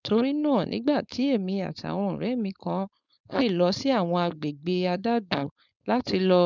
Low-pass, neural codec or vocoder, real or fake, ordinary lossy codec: 7.2 kHz; codec, 16 kHz, 4.8 kbps, FACodec; fake; none